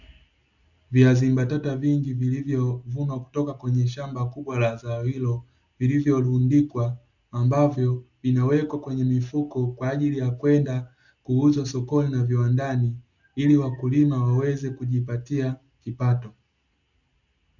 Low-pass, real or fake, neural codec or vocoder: 7.2 kHz; real; none